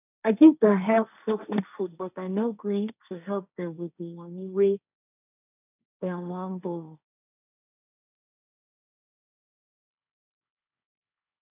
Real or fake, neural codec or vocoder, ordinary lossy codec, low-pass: fake; codec, 16 kHz, 1.1 kbps, Voila-Tokenizer; none; 3.6 kHz